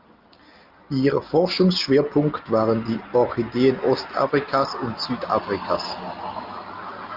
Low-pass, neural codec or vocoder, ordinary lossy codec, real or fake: 5.4 kHz; none; Opus, 24 kbps; real